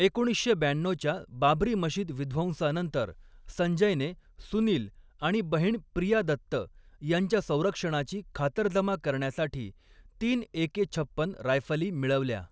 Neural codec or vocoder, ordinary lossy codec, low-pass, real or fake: none; none; none; real